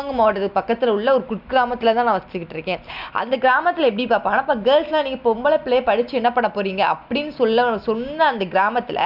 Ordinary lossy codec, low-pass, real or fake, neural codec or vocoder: none; 5.4 kHz; real; none